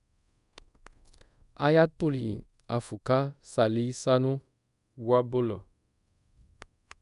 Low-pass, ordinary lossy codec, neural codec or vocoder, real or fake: 10.8 kHz; none; codec, 24 kHz, 0.5 kbps, DualCodec; fake